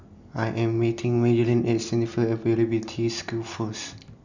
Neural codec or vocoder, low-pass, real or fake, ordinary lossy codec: none; 7.2 kHz; real; none